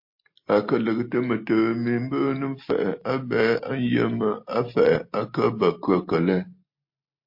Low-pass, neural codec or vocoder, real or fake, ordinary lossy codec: 5.4 kHz; none; real; MP3, 32 kbps